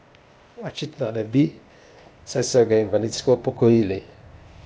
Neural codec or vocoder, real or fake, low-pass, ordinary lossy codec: codec, 16 kHz, 0.8 kbps, ZipCodec; fake; none; none